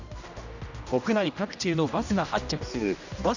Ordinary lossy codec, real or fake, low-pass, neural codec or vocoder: none; fake; 7.2 kHz; codec, 16 kHz, 1 kbps, X-Codec, HuBERT features, trained on general audio